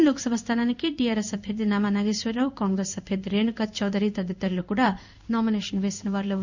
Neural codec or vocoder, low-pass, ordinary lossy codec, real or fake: codec, 16 kHz in and 24 kHz out, 1 kbps, XY-Tokenizer; 7.2 kHz; none; fake